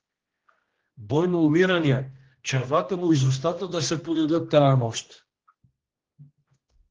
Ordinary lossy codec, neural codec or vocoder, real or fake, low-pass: Opus, 16 kbps; codec, 16 kHz, 1 kbps, X-Codec, HuBERT features, trained on general audio; fake; 7.2 kHz